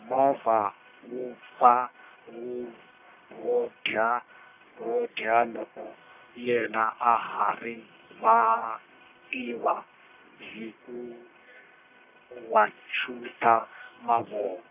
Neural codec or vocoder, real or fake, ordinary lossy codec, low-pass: codec, 44.1 kHz, 1.7 kbps, Pupu-Codec; fake; none; 3.6 kHz